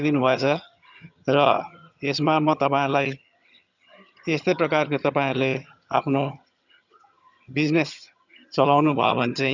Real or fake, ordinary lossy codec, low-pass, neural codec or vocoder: fake; none; 7.2 kHz; vocoder, 22.05 kHz, 80 mel bands, HiFi-GAN